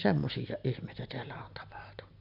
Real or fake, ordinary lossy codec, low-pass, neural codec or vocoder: real; none; 5.4 kHz; none